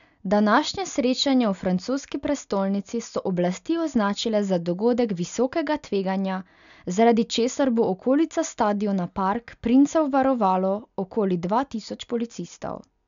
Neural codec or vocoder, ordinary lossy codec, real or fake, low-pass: none; none; real; 7.2 kHz